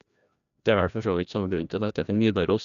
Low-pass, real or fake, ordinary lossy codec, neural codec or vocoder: 7.2 kHz; fake; none; codec, 16 kHz, 1 kbps, FreqCodec, larger model